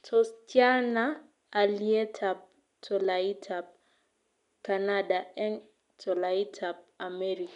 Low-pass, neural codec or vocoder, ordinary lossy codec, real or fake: 10.8 kHz; none; none; real